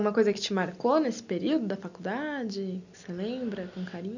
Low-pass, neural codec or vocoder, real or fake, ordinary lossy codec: 7.2 kHz; none; real; none